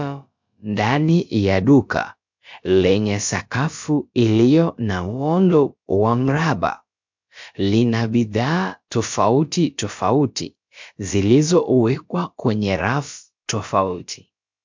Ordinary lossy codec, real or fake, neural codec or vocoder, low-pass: MP3, 64 kbps; fake; codec, 16 kHz, about 1 kbps, DyCAST, with the encoder's durations; 7.2 kHz